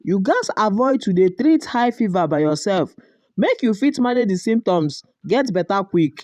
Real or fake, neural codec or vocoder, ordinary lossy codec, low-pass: fake; vocoder, 44.1 kHz, 128 mel bands every 512 samples, BigVGAN v2; none; 14.4 kHz